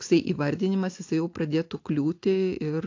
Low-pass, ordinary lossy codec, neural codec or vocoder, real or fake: 7.2 kHz; AAC, 48 kbps; none; real